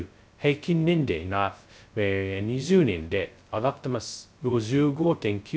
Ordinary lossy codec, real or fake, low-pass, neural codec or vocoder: none; fake; none; codec, 16 kHz, 0.2 kbps, FocalCodec